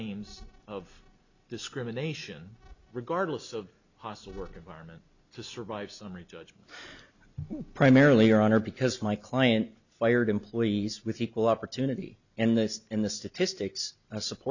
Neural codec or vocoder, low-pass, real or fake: none; 7.2 kHz; real